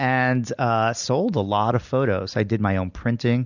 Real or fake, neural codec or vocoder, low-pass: real; none; 7.2 kHz